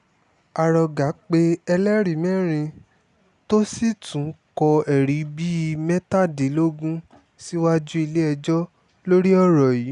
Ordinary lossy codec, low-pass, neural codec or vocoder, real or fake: none; 10.8 kHz; none; real